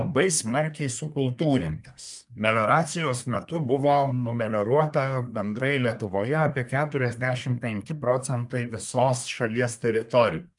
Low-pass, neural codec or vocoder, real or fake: 10.8 kHz; codec, 24 kHz, 1 kbps, SNAC; fake